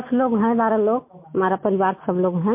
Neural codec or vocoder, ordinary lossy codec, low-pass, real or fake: none; MP3, 24 kbps; 3.6 kHz; real